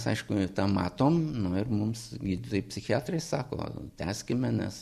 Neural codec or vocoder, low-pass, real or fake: none; 14.4 kHz; real